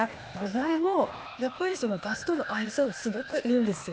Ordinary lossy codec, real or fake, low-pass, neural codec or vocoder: none; fake; none; codec, 16 kHz, 0.8 kbps, ZipCodec